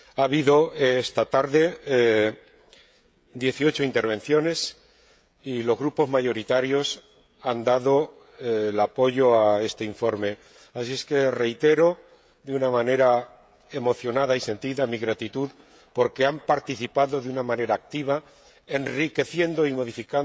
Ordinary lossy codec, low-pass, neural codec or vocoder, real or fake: none; none; codec, 16 kHz, 16 kbps, FreqCodec, smaller model; fake